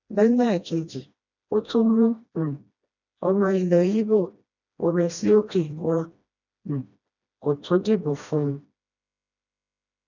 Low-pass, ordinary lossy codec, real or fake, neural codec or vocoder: 7.2 kHz; none; fake; codec, 16 kHz, 1 kbps, FreqCodec, smaller model